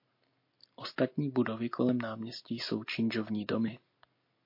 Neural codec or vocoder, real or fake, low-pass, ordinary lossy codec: none; real; 5.4 kHz; MP3, 32 kbps